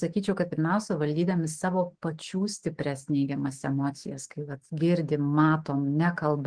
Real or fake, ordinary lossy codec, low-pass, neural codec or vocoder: fake; Opus, 16 kbps; 9.9 kHz; autoencoder, 48 kHz, 128 numbers a frame, DAC-VAE, trained on Japanese speech